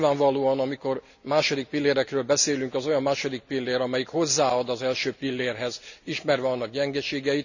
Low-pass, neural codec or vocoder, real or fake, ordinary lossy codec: 7.2 kHz; none; real; none